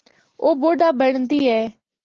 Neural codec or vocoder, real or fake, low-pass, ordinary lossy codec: none; real; 7.2 kHz; Opus, 16 kbps